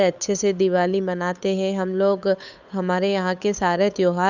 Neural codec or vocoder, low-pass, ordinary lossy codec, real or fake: codec, 16 kHz, 8 kbps, FunCodec, trained on Chinese and English, 25 frames a second; 7.2 kHz; none; fake